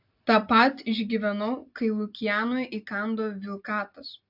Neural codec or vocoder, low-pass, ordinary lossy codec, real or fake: none; 5.4 kHz; AAC, 48 kbps; real